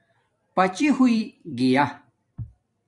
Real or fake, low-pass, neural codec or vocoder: fake; 10.8 kHz; vocoder, 44.1 kHz, 128 mel bands every 256 samples, BigVGAN v2